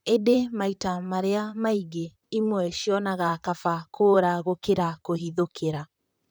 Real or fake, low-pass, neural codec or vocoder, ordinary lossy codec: fake; none; vocoder, 44.1 kHz, 128 mel bands, Pupu-Vocoder; none